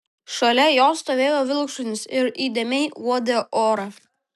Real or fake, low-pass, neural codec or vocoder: real; 14.4 kHz; none